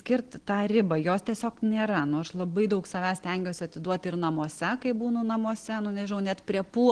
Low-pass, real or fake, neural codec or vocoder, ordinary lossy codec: 9.9 kHz; real; none; Opus, 16 kbps